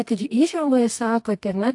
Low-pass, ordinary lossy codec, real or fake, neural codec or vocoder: 10.8 kHz; AAC, 64 kbps; fake; codec, 24 kHz, 0.9 kbps, WavTokenizer, medium music audio release